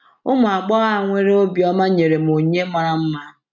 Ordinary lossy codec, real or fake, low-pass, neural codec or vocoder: MP3, 64 kbps; real; 7.2 kHz; none